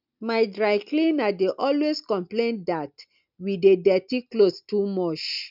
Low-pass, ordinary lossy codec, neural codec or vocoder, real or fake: 5.4 kHz; none; none; real